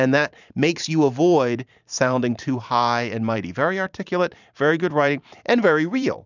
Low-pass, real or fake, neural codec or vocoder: 7.2 kHz; fake; vocoder, 44.1 kHz, 128 mel bands every 512 samples, BigVGAN v2